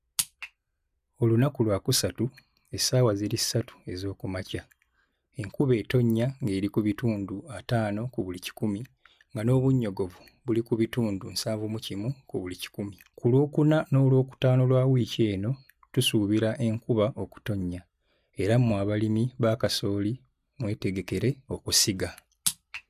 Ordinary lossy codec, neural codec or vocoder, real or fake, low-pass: none; none; real; 14.4 kHz